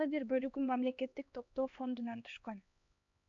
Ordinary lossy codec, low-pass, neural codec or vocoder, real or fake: MP3, 64 kbps; 7.2 kHz; codec, 16 kHz, 2 kbps, X-Codec, HuBERT features, trained on LibriSpeech; fake